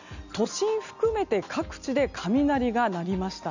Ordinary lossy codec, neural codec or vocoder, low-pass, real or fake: none; none; 7.2 kHz; real